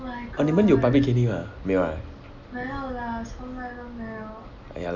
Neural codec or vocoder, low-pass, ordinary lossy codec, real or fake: none; 7.2 kHz; none; real